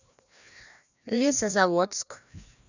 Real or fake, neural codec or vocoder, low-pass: fake; codec, 16 kHz, 1 kbps, FreqCodec, larger model; 7.2 kHz